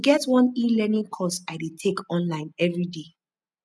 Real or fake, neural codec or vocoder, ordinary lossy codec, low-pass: real; none; none; none